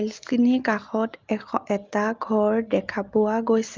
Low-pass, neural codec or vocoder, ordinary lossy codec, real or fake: 7.2 kHz; none; Opus, 32 kbps; real